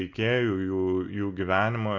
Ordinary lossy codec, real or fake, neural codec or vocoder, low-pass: Opus, 64 kbps; real; none; 7.2 kHz